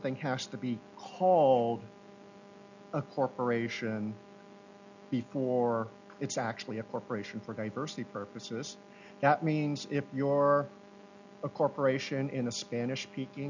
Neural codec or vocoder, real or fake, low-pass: none; real; 7.2 kHz